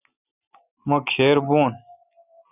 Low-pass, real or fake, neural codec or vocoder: 3.6 kHz; real; none